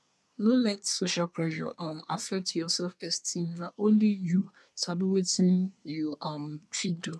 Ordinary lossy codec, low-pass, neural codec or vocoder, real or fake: none; none; codec, 24 kHz, 1 kbps, SNAC; fake